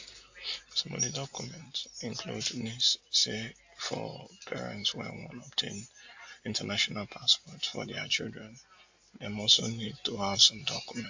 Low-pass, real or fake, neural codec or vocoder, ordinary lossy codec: 7.2 kHz; real; none; AAC, 48 kbps